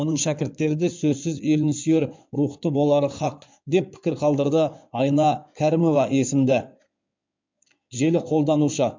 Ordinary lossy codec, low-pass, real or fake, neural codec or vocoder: MP3, 64 kbps; 7.2 kHz; fake; codec, 16 kHz in and 24 kHz out, 2.2 kbps, FireRedTTS-2 codec